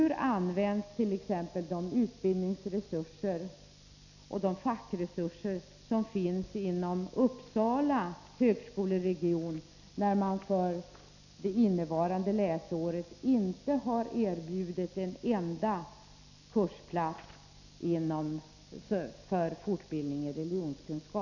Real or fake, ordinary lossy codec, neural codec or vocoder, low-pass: real; none; none; 7.2 kHz